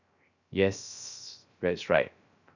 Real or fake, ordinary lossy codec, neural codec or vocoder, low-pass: fake; none; codec, 16 kHz, 0.3 kbps, FocalCodec; 7.2 kHz